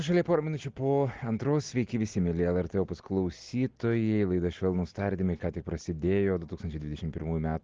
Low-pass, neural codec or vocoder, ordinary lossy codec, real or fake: 7.2 kHz; none; Opus, 16 kbps; real